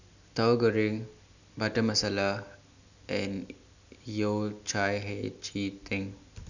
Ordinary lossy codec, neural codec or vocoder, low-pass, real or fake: none; none; 7.2 kHz; real